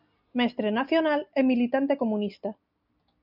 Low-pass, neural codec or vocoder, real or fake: 5.4 kHz; none; real